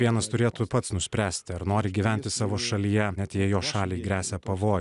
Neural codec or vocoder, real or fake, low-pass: none; real; 10.8 kHz